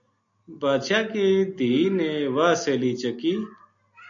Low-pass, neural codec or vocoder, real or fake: 7.2 kHz; none; real